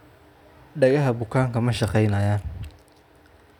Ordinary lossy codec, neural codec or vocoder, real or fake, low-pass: none; none; real; 19.8 kHz